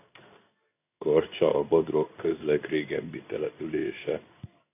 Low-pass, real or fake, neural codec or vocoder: 3.6 kHz; fake; codec, 16 kHz in and 24 kHz out, 1 kbps, XY-Tokenizer